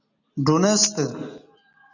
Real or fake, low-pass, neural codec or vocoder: real; 7.2 kHz; none